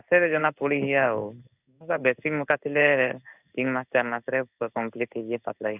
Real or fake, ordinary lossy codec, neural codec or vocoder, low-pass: fake; none; codec, 16 kHz in and 24 kHz out, 1 kbps, XY-Tokenizer; 3.6 kHz